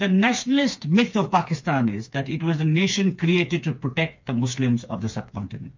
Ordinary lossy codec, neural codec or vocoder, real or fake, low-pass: MP3, 48 kbps; codec, 16 kHz, 4 kbps, FreqCodec, smaller model; fake; 7.2 kHz